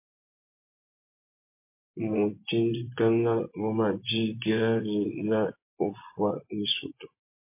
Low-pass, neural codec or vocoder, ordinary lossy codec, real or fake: 3.6 kHz; vocoder, 24 kHz, 100 mel bands, Vocos; MP3, 24 kbps; fake